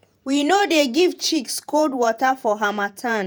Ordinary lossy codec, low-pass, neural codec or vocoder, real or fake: none; none; vocoder, 48 kHz, 128 mel bands, Vocos; fake